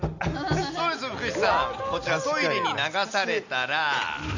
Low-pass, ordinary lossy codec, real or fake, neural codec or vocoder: 7.2 kHz; none; real; none